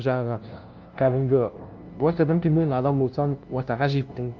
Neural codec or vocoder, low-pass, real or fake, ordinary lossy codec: codec, 16 kHz, 0.5 kbps, FunCodec, trained on LibriTTS, 25 frames a second; 7.2 kHz; fake; Opus, 24 kbps